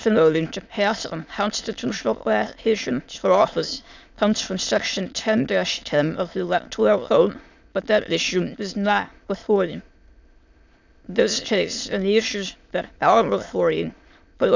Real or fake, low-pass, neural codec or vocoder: fake; 7.2 kHz; autoencoder, 22.05 kHz, a latent of 192 numbers a frame, VITS, trained on many speakers